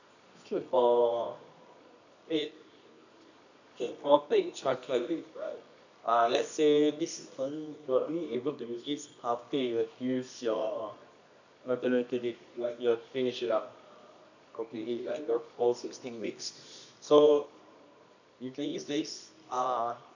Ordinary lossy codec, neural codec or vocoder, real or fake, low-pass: none; codec, 24 kHz, 0.9 kbps, WavTokenizer, medium music audio release; fake; 7.2 kHz